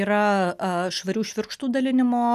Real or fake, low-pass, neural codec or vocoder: real; 14.4 kHz; none